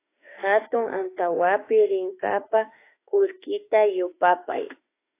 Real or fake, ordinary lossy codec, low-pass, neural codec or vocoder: fake; AAC, 24 kbps; 3.6 kHz; autoencoder, 48 kHz, 32 numbers a frame, DAC-VAE, trained on Japanese speech